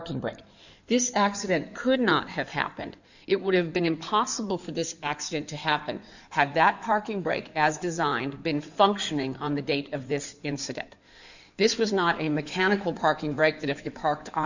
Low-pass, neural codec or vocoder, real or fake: 7.2 kHz; codec, 16 kHz in and 24 kHz out, 2.2 kbps, FireRedTTS-2 codec; fake